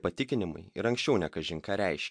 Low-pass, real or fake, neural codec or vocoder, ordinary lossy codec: 9.9 kHz; real; none; MP3, 64 kbps